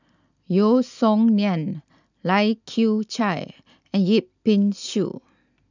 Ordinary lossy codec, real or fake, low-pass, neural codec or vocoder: none; real; 7.2 kHz; none